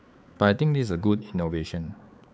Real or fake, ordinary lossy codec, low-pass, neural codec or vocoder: fake; none; none; codec, 16 kHz, 4 kbps, X-Codec, HuBERT features, trained on balanced general audio